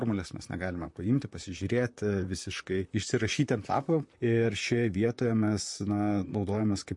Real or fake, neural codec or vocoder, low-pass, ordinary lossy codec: fake; vocoder, 44.1 kHz, 128 mel bands, Pupu-Vocoder; 10.8 kHz; MP3, 48 kbps